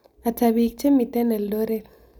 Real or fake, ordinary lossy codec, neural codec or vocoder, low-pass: real; none; none; none